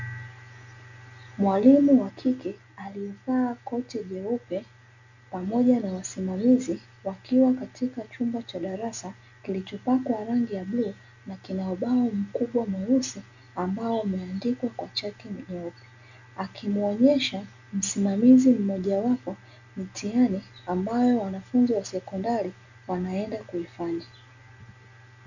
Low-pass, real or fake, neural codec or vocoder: 7.2 kHz; real; none